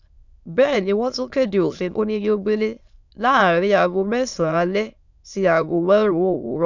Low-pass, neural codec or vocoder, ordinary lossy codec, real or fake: 7.2 kHz; autoencoder, 22.05 kHz, a latent of 192 numbers a frame, VITS, trained on many speakers; none; fake